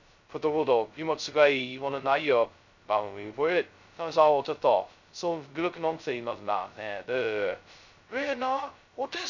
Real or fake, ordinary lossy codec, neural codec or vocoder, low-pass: fake; none; codec, 16 kHz, 0.2 kbps, FocalCodec; 7.2 kHz